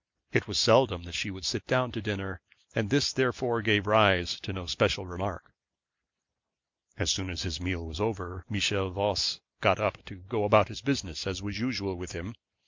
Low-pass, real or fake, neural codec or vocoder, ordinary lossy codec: 7.2 kHz; real; none; MP3, 64 kbps